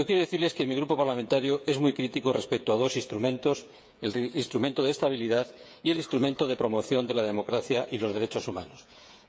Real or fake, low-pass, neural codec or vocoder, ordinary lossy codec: fake; none; codec, 16 kHz, 8 kbps, FreqCodec, smaller model; none